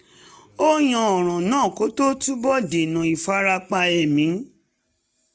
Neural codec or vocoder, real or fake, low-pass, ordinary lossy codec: none; real; none; none